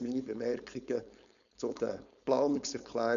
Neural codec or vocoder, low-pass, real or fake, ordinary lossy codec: codec, 16 kHz, 4.8 kbps, FACodec; 7.2 kHz; fake; none